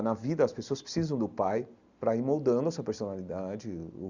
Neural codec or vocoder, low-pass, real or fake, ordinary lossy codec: none; 7.2 kHz; real; Opus, 64 kbps